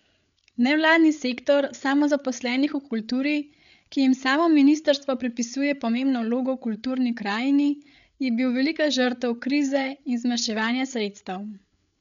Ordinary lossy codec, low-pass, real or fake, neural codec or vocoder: none; 7.2 kHz; fake; codec, 16 kHz, 8 kbps, FreqCodec, larger model